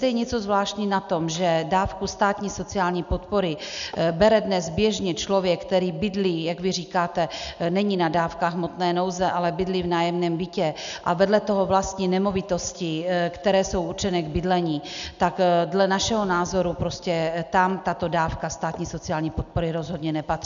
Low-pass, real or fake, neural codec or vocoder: 7.2 kHz; real; none